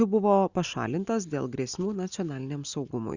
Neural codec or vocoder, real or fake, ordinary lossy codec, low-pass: none; real; Opus, 64 kbps; 7.2 kHz